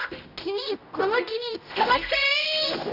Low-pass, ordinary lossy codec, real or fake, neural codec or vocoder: 5.4 kHz; none; fake; codec, 16 kHz, 0.5 kbps, X-Codec, HuBERT features, trained on general audio